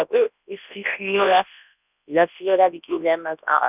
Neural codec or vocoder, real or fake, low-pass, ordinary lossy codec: codec, 24 kHz, 0.9 kbps, WavTokenizer, large speech release; fake; 3.6 kHz; none